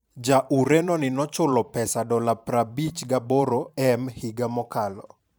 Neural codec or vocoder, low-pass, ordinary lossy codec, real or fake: vocoder, 44.1 kHz, 128 mel bands every 512 samples, BigVGAN v2; none; none; fake